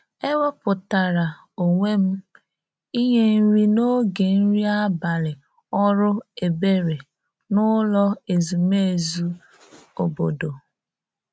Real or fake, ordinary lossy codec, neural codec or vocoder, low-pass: real; none; none; none